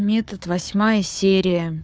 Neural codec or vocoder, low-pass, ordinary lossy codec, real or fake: codec, 16 kHz, 4 kbps, FunCodec, trained on Chinese and English, 50 frames a second; none; none; fake